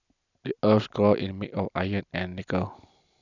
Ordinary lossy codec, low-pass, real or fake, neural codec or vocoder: none; 7.2 kHz; real; none